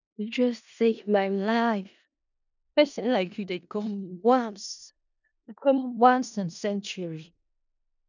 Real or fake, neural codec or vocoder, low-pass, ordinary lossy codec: fake; codec, 16 kHz in and 24 kHz out, 0.4 kbps, LongCat-Audio-Codec, four codebook decoder; 7.2 kHz; none